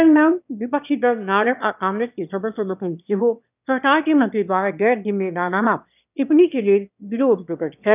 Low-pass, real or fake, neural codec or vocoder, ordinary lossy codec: 3.6 kHz; fake; autoencoder, 22.05 kHz, a latent of 192 numbers a frame, VITS, trained on one speaker; none